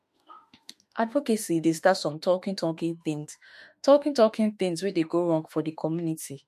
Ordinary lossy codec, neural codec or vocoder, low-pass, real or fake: MP3, 64 kbps; autoencoder, 48 kHz, 32 numbers a frame, DAC-VAE, trained on Japanese speech; 14.4 kHz; fake